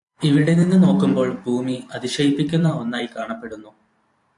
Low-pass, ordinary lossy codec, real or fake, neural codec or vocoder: 10.8 kHz; AAC, 64 kbps; fake; vocoder, 44.1 kHz, 128 mel bands every 512 samples, BigVGAN v2